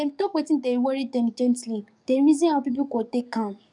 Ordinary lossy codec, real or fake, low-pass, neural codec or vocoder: none; fake; 10.8 kHz; codec, 44.1 kHz, 7.8 kbps, DAC